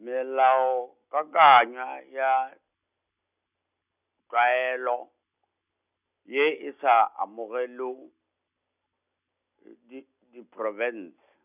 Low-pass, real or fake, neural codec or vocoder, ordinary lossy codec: 3.6 kHz; real; none; none